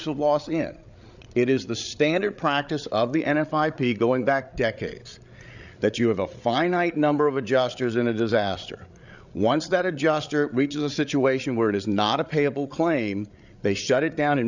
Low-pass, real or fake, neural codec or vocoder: 7.2 kHz; fake; codec, 16 kHz, 8 kbps, FreqCodec, larger model